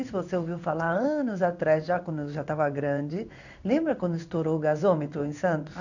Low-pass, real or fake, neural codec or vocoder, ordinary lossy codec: 7.2 kHz; fake; codec, 16 kHz in and 24 kHz out, 1 kbps, XY-Tokenizer; none